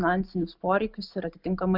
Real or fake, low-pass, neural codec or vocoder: real; 5.4 kHz; none